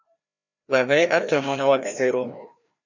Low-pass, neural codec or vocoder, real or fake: 7.2 kHz; codec, 16 kHz, 1 kbps, FreqCodec, larger model; fake